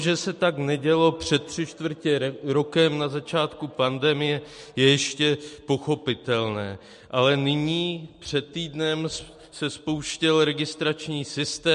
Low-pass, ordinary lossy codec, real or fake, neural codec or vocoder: 10.8 kHz; MP3, 48 kbps; real; none